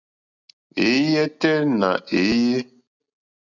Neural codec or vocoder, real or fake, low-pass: none; real; 7.2 kHz